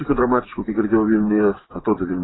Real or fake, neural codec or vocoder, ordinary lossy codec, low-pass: fake; vocoder, 24 kHz, 100 mel bands, Vocos; AAC, 16 kbps; 7.2 kHz